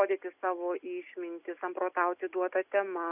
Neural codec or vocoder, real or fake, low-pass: none; real; 3.6 kHz